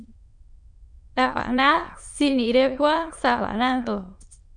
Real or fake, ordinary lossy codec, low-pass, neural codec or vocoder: fake; MP3, 64 kbps; 9.9 kHz; autoencoder, 22.05 kHz, a latent of 192 numbers a frame, VITS, trained on many speakers